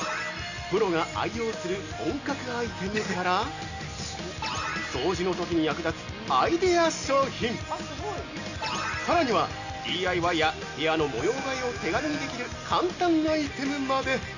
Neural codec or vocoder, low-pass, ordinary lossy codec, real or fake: none; 7.2 kHz; none; real